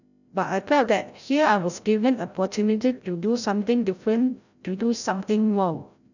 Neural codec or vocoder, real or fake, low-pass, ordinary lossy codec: codec, 16 kHz, 0.5 kbps, FreqCodec, larger model; fake; 7.2 kHz; none